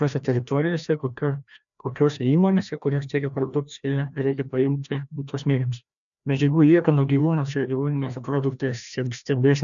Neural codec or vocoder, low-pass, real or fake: codec, 16 kHz, 1 kbps, FreqCodec, larger model; 7.2 kHz; fake